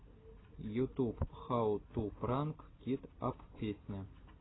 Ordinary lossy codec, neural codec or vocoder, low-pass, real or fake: AAC, 16 kbps; none; 7.2 kHz; real